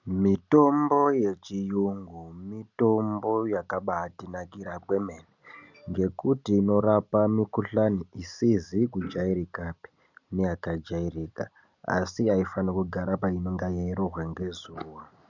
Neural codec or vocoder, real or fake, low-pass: none; real; 7.2 kHz